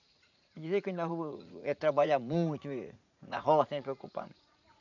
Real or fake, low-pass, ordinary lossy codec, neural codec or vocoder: fake; 7.2 kHz; none; vocoder, 44.1 kHz, 80 mel bands, Vocos